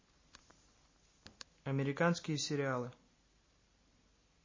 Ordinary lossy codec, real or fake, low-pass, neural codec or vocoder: MP3, 32 kbps; real; 7.2 kHz; none